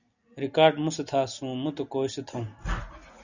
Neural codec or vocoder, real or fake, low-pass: none; real; 7.2 kHz